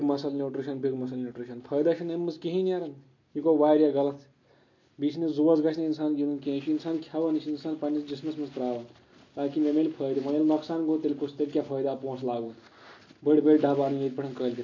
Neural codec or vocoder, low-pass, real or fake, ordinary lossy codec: none; 7.2 kHz; real; MP3, 48 kbps